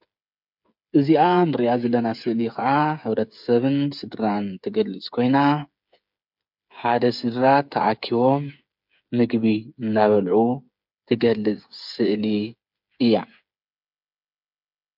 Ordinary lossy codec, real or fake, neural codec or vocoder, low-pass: MP3, 48 kbps; fake; codec, 16 kHz, 8 kbps, FreqCodec, smaller model; 5.4 kHz